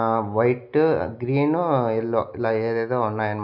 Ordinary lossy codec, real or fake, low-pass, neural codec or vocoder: none; real; 5.4 kHz; none